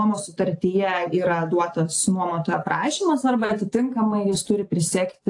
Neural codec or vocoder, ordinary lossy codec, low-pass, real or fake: none; AAC, 48 kbps; 10.8 kHz; real